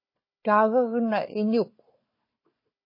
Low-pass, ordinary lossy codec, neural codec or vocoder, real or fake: 5.4 kHz; MP3, 24 kbps; codec, 16 kHz, 4 kbps, FunCodec, trained on Chinese and English, 50 frames a second; fake